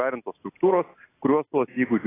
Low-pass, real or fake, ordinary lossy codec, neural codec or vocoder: 3.6 kHz; real; AAC, 16 kbps; none